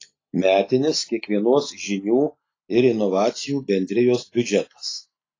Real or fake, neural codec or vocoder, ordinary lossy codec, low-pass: real; none; AAC, 32 kbps; 7.2 kHz